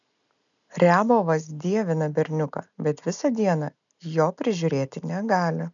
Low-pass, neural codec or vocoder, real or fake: 7.2 kHz; none; real